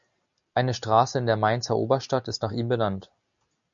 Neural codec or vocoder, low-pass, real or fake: none; 7.2 kHz; real